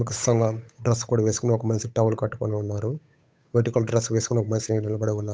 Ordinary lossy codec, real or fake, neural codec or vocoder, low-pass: none; fake; codec, 16 kHz, 8 kbps, FunCodec, trained on Chinese and English, 25 frames a second; none